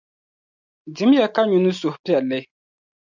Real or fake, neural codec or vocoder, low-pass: real; none; 7.2 kHz